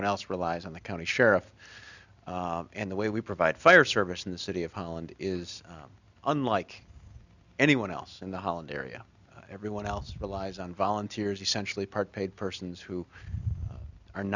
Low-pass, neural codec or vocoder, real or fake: 7.2 kHz; none; real